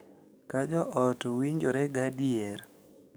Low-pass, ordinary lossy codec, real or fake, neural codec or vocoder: none; none; fake; codec, 44.1 kHz, 7.8 kbps, DAC